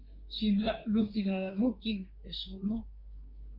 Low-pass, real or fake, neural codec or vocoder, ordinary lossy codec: 5.4 kHz; fake; codec, 24 kHz, 1 kbps, SNAC; MP3, 48 kbps